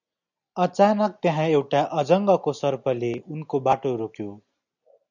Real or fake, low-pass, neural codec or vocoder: real; 7.2 kHz; none